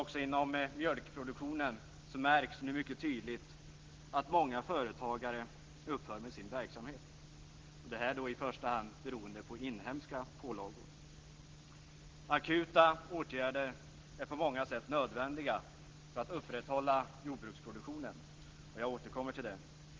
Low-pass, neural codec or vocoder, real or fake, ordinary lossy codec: 7.2 kHz; none; real; Opus, 16 kbps